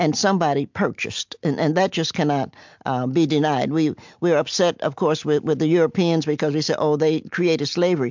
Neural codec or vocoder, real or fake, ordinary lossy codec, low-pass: none; real; MP3, 64 kbps; 7.2 kHz